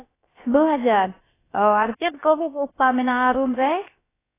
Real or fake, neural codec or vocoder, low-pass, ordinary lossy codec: fake; codec, 16 kHz, about 1 kbps, DyCAST, with the encoder's durations; 3.6 kHz; AAC, 16 kbps